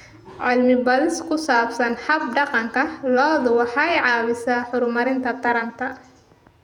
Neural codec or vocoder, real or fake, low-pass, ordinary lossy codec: vocoder, 48 kHz, 128 mel bands, Vocos; fake; 19.8 kHz; none